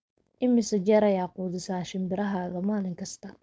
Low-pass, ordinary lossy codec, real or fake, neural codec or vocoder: none; none; fake; codec, 16 kHz, 4.8 kbps, FACodec